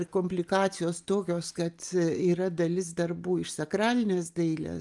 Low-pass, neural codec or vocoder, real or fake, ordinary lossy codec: 10.8 kHz; none; real; Opus, 32 kbps